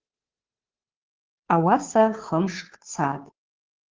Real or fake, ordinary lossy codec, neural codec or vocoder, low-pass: fake; Opus, 16 kbps; codec, 16 kHz, 2 kbps, FunCodec, trained on Chinese and English, 25 frames a second; 7.2 kHz